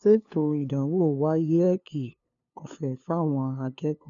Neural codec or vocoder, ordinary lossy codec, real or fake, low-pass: codec, 16 kHz, 2 kbps, FunCodec, trained on LibriTTS, 25 frames a second; none; fake; 7.2 kHz